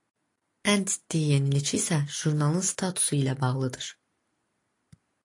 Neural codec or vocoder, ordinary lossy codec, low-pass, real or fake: none; AAC, 48 kbps; 10.8 kHz; real